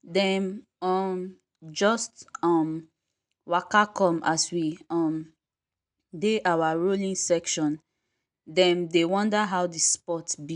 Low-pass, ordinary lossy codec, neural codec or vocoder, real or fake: 10.8 kHz; none; none; real